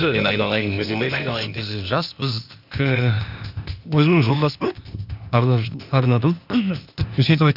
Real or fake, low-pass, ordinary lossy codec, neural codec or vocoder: fake; 5.4 kHz; none; codec, 16 kHz, 0.8 kbps, ZipCodec